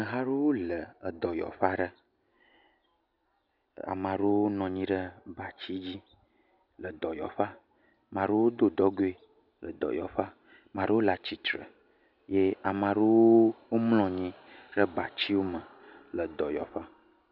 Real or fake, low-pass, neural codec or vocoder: real; 5.4 kHz; none